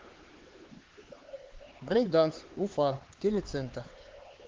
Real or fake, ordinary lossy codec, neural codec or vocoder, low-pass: fake; Opus, 16 kbps; codec, 16 kHz, 4 kbps, X-Codec, HuBERT features, trained on LibriSpeech; 7.2 kHz